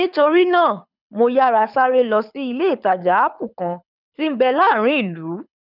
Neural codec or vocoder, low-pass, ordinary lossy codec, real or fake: codec, 24 kHz, 6 kbps, HILCodec; 5.4 kHz; none; fake